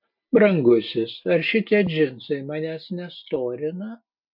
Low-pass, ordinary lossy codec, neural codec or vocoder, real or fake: 5.4 kHz; MP3, 48 kbps; none; real